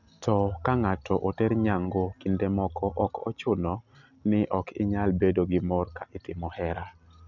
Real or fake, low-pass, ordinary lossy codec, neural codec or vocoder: real; 7.2 kHz; none; none